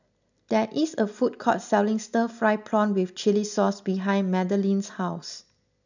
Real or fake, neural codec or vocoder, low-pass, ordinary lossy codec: real; none; 7.2 kHz; none